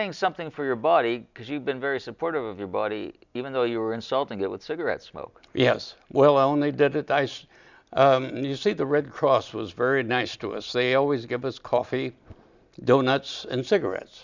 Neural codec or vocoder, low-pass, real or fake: none; 7.2 kHz; real